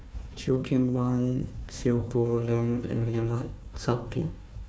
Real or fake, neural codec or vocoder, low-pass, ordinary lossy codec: fake; codec, 16 kHz, 1 kbps, FunCodec, trained on Chinese and English, 50 frames a second; none; none